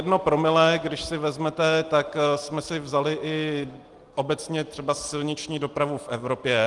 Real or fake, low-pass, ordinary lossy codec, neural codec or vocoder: real; 10.8 kHz; Opus, 24 kbps; none